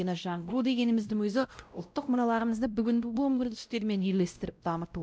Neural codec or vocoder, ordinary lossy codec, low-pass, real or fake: codec, 16 kHz, 0.5 kbps, X-Codec, WavLM features, trained on Multilingual LibriSpeech; none; none; fake